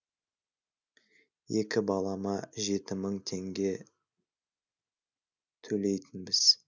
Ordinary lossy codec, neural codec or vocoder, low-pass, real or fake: none; none; 7.2 kHz; real